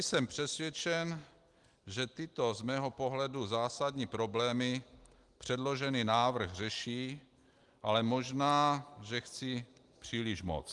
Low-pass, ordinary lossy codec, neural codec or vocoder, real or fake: 10.8 kHz; Opus, 24 kbps; none; real